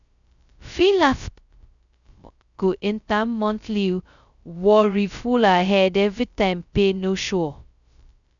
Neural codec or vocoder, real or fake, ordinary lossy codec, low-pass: codec, 16 kHz, 0.2 kbps, FocalCodec; fake; none; 7.2 kHz